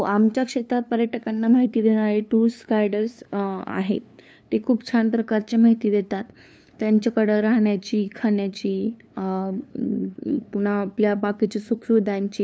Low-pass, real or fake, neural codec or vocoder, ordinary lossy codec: none; fake; codec, 16 kHz, 2 kbps, FunCodec, trained on LibriTTS, 25 frames a second; none